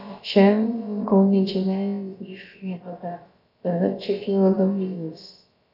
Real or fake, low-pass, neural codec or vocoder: fake; 5.4 kHz; codec, 16 kHz, about 1 kbps, DyCAST, with the encoder's durations